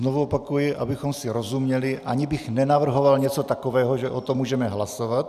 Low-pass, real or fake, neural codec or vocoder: 14.4 kHz; real; none